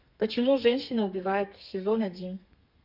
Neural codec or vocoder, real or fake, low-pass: codec, 32 kHz, 1.9 kbps, SNAC; fake; 5.4 kHz